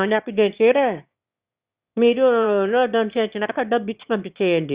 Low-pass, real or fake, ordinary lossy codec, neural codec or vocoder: 3.6 kHz; fake; Opus, 64 kbps; autoencoder, 22.05 kHz, a latent of 192 numbers a frame, VITS, trained on one speaker